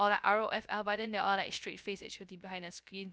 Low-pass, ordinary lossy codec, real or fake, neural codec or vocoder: none; none; fake; codec, 16 kHz, 0.3 kbps, FocalCodec